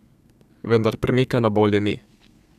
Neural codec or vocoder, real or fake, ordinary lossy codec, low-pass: codec, 32 kHz, 1.9 kbps, SNAC; fake; none; 14.4 kHz